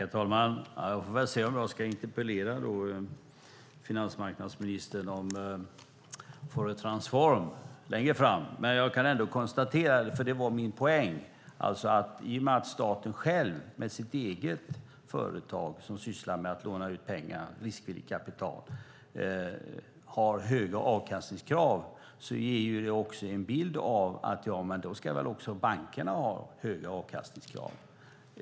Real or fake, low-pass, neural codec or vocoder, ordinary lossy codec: real; none; none; none